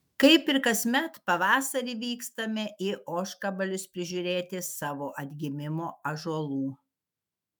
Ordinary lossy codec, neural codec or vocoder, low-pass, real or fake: MP3, 96 kbps; autoencoder, 48 kHz, 128 numbers a frame, DAC-VAE, trained on Japanese speech; 19.8 kHz; fake